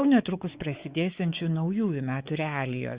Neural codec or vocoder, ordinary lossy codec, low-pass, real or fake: codec, 16 kHz, 4 kbps, X-Codec, WavLM features, trained on Multilingual LibriSpeech; Opus, 64 kbps; 3.6 kHz; fake